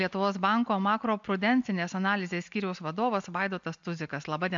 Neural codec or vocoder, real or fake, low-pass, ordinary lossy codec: none; real; 7.2 kHz; MP3, 64 kbps